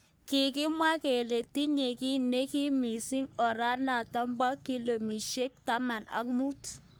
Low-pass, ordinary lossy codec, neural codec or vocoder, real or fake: none; none; codec, 44.1 kHz, 3.4 kbps, Pupu-Codec; fake